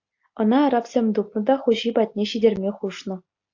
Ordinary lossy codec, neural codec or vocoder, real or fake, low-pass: AAC, 48 kbps; none; real; 7.2 kHz